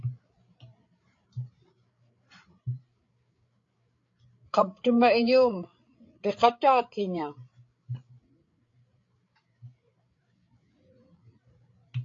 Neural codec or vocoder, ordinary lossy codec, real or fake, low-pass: codec, 16 kHz, 8 kbps, FreqCodec, larger model; AAC, 48 kbps; fake; 7.2 kHz